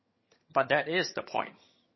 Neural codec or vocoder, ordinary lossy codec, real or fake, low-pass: vocoder, 22.05 kHz, 80 mel bands, HiFi-GAN; MP3, 24 kbps; fake; 7.2 kHz